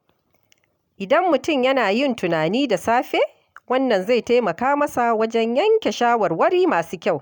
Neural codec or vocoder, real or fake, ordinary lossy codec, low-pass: none; real; none; 19.8 kHz